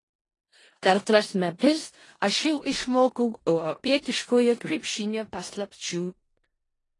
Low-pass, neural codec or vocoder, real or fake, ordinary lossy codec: 10.8 kHz; codec, 16 kHz in and 24 kHz out, 0.4 kbps, LongCat-Audio-Codec, four codebook decoder; fake; AAC, 32 kbps